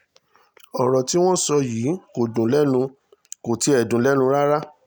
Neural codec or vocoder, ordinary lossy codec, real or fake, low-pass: none; none; real; none